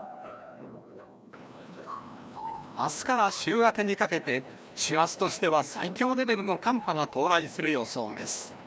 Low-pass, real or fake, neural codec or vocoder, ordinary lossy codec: none; fake; codec, 16 kHz, 1 kbps, FreqCodec, larger model; none